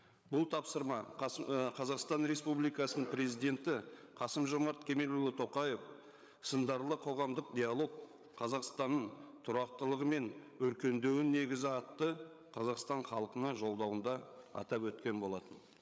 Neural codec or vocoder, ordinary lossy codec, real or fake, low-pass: codec, 16 kHz, 8 kbps, FreqCodec, larger model; none; fake; none